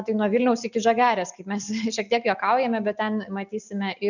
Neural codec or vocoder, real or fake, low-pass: none; real; 7.2 kHz